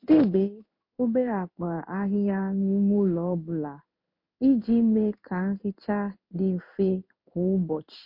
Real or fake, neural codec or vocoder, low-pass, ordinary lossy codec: fake; codec, 16 kHz in and 24 kHz out, 1 kbps, XY-Tokenizer; 5.4 kHz; MP3, 32 kbps